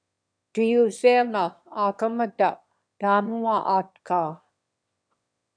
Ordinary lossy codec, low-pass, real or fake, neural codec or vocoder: MP3, 96 kbps; 9.9 kHz; fake; autoencoder, 22.05 kHz, a latent of 192 numbers a frame, VITS, trained on one speaker